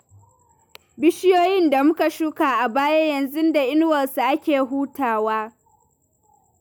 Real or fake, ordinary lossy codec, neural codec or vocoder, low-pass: real; none; none; none